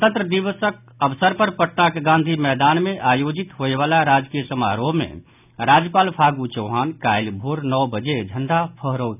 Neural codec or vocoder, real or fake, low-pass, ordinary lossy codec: none; real; 3.6 kHz; none